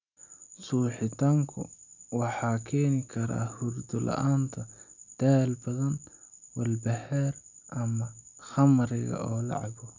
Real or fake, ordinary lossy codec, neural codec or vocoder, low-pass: real; none; none; 7.2 kHz